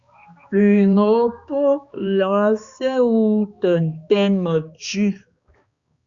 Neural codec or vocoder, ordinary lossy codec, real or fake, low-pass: codec, 16 kHz, 2 kbps, X-Codec, HuBERT features, trained on balanced general audio; Opus, 64 kbps; fake; 7.2 kHz